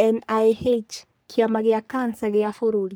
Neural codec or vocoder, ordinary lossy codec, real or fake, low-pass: codec, 44.1 kHz, 3.4 kbps, Pupu-Codec; none; fake; none